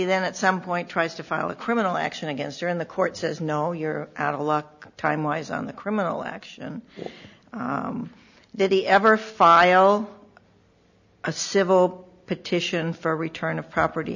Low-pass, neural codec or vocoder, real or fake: 7.2 kHz; none; real